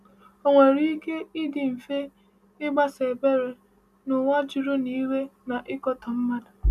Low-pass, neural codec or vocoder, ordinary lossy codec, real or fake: 14.4 kHz; none; none; real